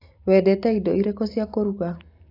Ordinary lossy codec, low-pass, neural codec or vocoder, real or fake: AAC, 32 kbps; 5.4 kHz; none; real